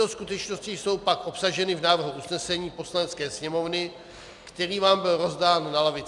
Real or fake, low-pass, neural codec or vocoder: real; 10.8 kHz; none